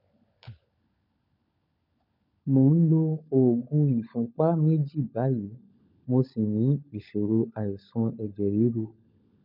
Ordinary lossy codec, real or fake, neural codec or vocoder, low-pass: none; fake; codec, 16 kHz, 16 kbps, FunCodec, trained on LibriTTS, 50 frames a second; 5.4 kHz